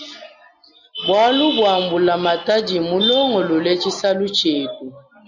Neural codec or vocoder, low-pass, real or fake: none; 7.2 kHz; real